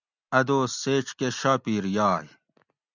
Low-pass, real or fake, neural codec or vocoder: 7.2 kHz; real; none